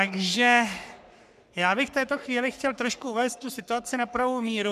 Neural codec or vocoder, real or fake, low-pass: codec, 44.1 kHz, 3.4 kbps, Pupu-Codec; fake; 14.4 kHz